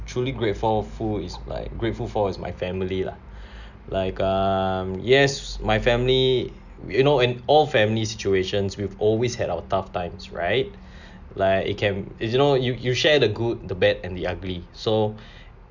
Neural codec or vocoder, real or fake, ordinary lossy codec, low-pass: none; real; none; 7.2 kHz